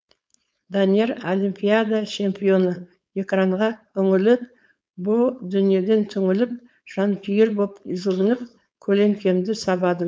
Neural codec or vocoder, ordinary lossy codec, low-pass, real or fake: codec, 16 kHz, 4.8 kbps, FACodec; none; none; fake